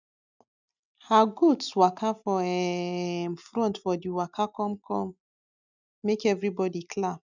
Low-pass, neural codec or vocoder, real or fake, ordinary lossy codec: 7.2 kHz; none; real; none